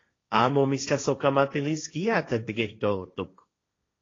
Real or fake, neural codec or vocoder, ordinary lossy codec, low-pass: fake; codec, 16 kHz, 1.1 kbps, Voila-Tokenizer; AAC, 32 kbps; 7.2 kHz